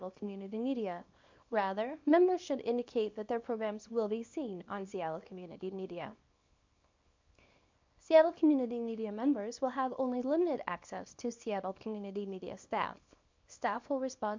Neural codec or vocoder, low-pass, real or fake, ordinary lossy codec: codec, 24 kHz, 0.9 kbps, WavTokenizer, small release; 7.2 kHz; fake; MP3, 64 kbps